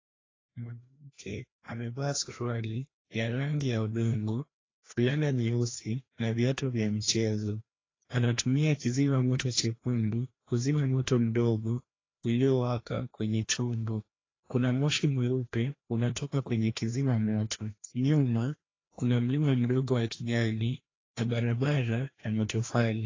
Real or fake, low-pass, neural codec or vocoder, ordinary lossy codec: fake; 7.2 kHz; codec, 16 kHz, 1 kbps, FreqCodec, larger model; AAC, 32 kbps